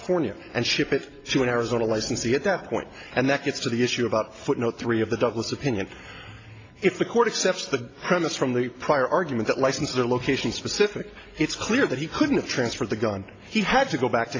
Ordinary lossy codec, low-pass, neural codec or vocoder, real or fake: AAC, 32 kbps; 7.2 kHz; none; real